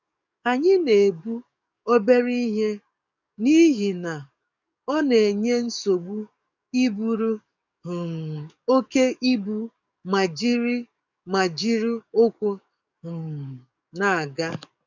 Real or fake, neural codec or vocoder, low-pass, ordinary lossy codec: fake; codec, 44.1 kHz, 7.8 kbps, DAC; 7.2 kHz; none